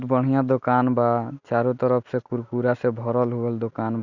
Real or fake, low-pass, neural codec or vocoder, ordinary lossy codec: real; 7.2 kHz; none; none